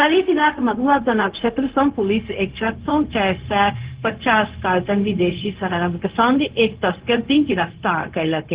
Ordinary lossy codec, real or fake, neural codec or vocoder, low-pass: Opus, 16 kbps; fake; codec, 16 kHz, 0.4 kbps, LongCat-Audio-Codec; 3.6 kHz